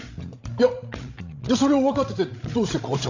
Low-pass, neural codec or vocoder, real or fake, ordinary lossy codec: 7.2 kHz; codec, 16 kHz, 16 kbps, FreqCodec, larger model; fake; AAC, 48 kbps